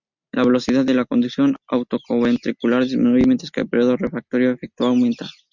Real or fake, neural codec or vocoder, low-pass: real; none; 7.2 kHz